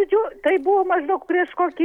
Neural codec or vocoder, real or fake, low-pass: none; real; 19.8 kHz